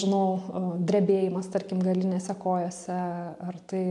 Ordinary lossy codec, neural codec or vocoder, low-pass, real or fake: MP3, 64 kbps; none; 10.8 kHz; real